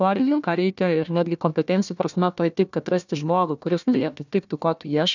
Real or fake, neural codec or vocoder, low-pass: fake; codec, 16 kHz, 1 kbps, FunCodec, trained on Chinese and English, 50 frames a second; 7.2 kHz